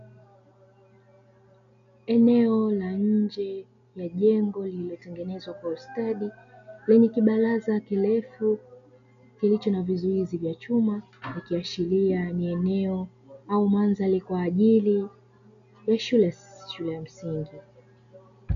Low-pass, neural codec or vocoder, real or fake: 7.2 kHz; none; real